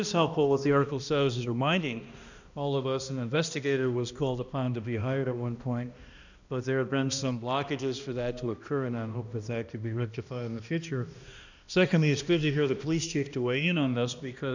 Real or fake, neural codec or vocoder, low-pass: fake; codec, 16 kHz, 1 kbps, X-Codec, HuBERT features, trained on balanced general audio; 7.2 kHz